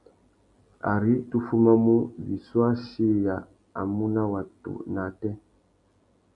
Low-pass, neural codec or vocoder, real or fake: 10.8 kHz; none; real